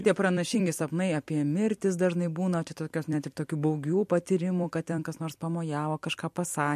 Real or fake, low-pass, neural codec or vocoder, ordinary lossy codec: fake; 14.4 kHz; vocoder, 44.1 kHz, 128 mel bands every 256 samples, BigVGAN v2; MP3, 64 kbps